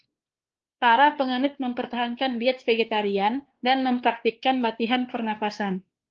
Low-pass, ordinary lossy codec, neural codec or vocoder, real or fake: 7.2 kHz; Opus, 16 kbps; codec, 16 kHz, 2 kbps, X-Codec, WavLM features, trained on Multilingual LibriSpeech; fake